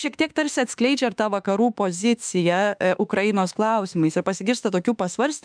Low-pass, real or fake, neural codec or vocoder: 9.9 kHz; fake; autoencoder, 48 kHz, 32 numbers a frame, DAC-VAE, trained on Japanese speech